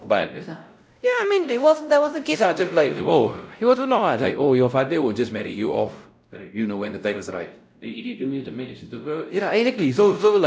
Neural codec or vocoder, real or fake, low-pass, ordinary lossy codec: codec, 16 kHz, 0.5 kbps, X-Codec, WavLM features, trained on Multilingual LibriSpeech; fake; none; none